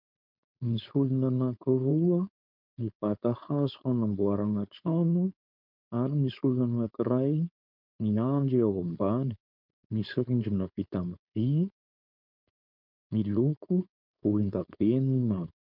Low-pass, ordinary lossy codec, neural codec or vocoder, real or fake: 5.4 kHz; MP3, 48 kbps; codec, 16 kHz, 4.8 kbps, FACodec; fake